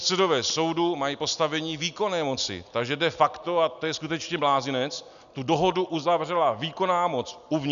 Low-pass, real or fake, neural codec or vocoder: 7.2 kHz; real; none